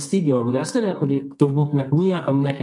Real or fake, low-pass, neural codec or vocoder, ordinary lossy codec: fake; 10.8 kHz; codec, 24 kHz, 0.9 kbps, WavTokenizer, medium music audio release; MP3, 64 kbps